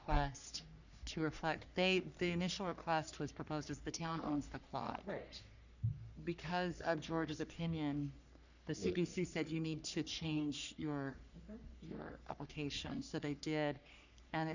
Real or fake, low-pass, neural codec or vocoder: fake; 7.2 kHz; codec, 44.1 kHz, 3.4 kbps, Pupu-Codec